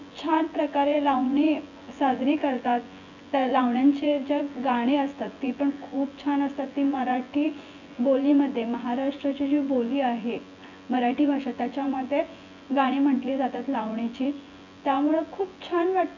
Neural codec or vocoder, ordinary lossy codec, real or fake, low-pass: vocoder, 24 kHz, 100 mel bands, Vocos; none; fake; 7.2 kHz